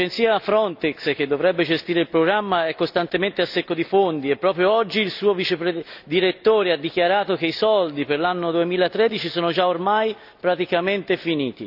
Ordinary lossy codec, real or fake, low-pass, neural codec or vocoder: none; real; 5.4 kHz; none